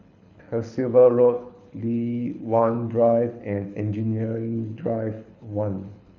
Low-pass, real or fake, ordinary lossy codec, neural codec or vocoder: 7.2 kHz; fake; none; codec, 24 kHz, 6 kbps, HILCodec